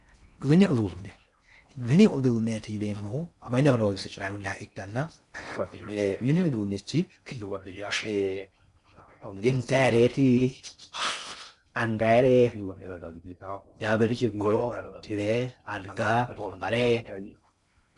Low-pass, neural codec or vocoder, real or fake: 10.8 kHz; codec, 16 kHz in and 24 kHz out, 0.6 kbps, FocalCodec, streaming, 4096 codes; fake